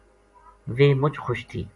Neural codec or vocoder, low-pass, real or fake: none; 10.8 kHz; real